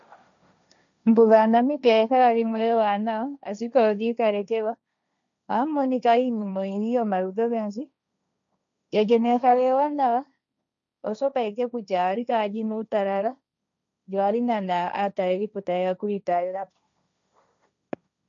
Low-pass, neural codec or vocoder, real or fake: 7.2 kHz; codec, 16 kHz, 1.1 kbps, Voila-Tokenizer; fake